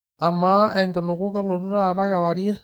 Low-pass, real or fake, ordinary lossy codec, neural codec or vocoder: none; fake; none; codec, 44.1 kHz, 2.6 kbps, SNAC